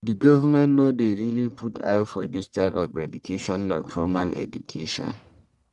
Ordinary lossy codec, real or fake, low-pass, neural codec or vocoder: none; fake; 10.8 kHz; codec, 44.1 kHz, 1.7 kbps, Pupu-Codec